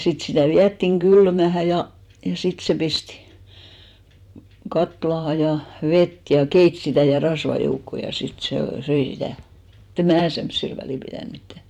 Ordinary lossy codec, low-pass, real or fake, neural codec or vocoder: none; 19.8 kHz; fake; vocoder, 44.1 kHz, 128 mel bands every 512 samples, BigVGAN v2